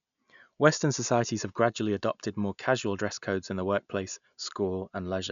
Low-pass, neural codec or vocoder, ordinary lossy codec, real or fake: 7.2 kHz; none; none; real